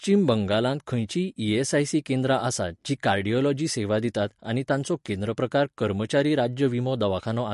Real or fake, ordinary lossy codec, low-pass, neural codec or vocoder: real; MP3, 48 kbps; 14.4 kHz; none